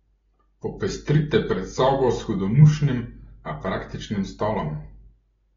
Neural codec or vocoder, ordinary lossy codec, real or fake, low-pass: none; AAC, 24 kbps; real; 7.2 kHz